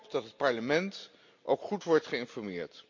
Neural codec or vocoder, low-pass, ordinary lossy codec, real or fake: none; 7.2 kHz; MP3, 48 kbps; real